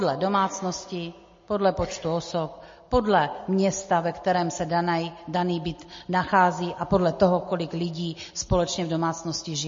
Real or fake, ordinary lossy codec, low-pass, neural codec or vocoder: real; MP3, 32 kbps; 7.2 kHz; none